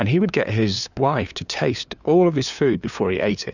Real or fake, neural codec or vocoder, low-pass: fake; codec, 16 kHz, 2 kbps, FunCodec, trained on LibriTTS, 25 frames a second; 7.2 kHz